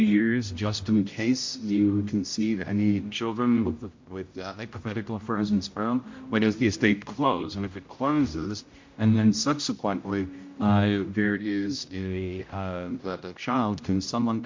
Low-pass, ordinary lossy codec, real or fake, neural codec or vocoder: 7.2 kHz; MP3, 48 kbps; fake; codec, 16 kHz, 0.5 kbps, X-Codec, HuBERT features, trained on general audio